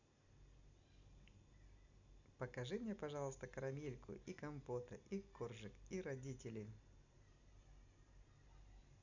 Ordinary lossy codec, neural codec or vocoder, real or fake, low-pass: none; none; real; 7.2 kHz